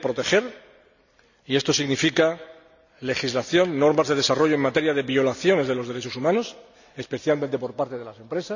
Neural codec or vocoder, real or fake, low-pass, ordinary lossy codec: none; real; 7.2 kHz; none